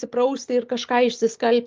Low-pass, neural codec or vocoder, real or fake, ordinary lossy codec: 7.2 kHz; none; real; Opus, 32 kbps